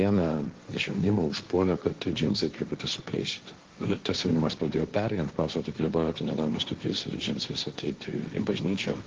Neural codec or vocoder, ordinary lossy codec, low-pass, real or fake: codec, 16 kHz, 1.1 kbps, Voila-Tokenizer; Opus, 16 kbps; 7.2 kHz; fake